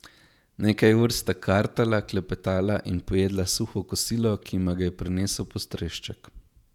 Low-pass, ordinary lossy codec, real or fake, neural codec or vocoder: 19.8 kHz; none; fake; vocoder, 48 kHz, 128 mel bands, Vocos